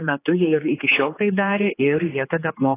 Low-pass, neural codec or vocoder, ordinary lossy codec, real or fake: 3.6 kHz; codec, 16 kHz, 4 kbps, X-Codec, HuBERT features, trained on general audio; AAC, 16 kbps; fake